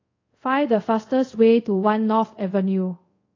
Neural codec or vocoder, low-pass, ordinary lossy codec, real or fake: codec, 24 kHz, 0.5 kbps, DualCodec; 7.2 kHz; AAC, 32 kbps; fake